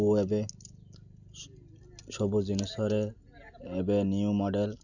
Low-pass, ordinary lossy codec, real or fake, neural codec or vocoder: 7.2 kHz; none; real; none